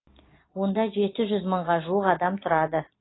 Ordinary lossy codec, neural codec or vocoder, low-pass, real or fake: AAC, 16 kbps; none; 7.2 kHz; real